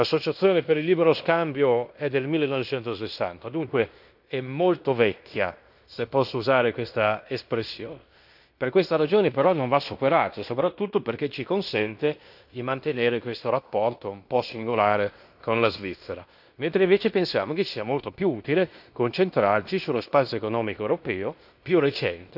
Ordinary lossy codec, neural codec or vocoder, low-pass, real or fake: none; codec, 16 kHz in and 24 kHz out, 0.9 kbps, LongCat-Audio-Codec, fine tuned four codebook decoder; 5.4 kHz; fake